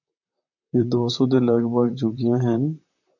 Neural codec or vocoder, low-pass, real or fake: vocoder, 44.1 kHz, 128 mel bands, Pupu-Vocoder; 7.2 kHz; fake